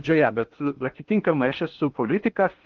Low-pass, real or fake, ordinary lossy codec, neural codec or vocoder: 7.2 kHz; fake; Opus, 32 kbps; codec, 16 kHz in and 24 kHz out, 0.8 kbps, FocalCodec, streaming, 65536 codes